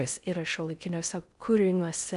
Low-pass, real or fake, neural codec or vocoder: 10.8 kHz; fake; codec, 16 kHz in and 24 kHz out, 0.6 kbps, FocalCodec, streaming, 4096 codes